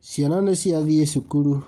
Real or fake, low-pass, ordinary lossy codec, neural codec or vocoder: real; 19.8 kHz; Opus, 32 kbps; none